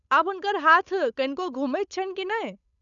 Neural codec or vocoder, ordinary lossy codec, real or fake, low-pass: codec, 16 kHz, 8 kbps, FunCodec, trained on Chinese and English, 25 frames a second; none; fake; 7.2 kHz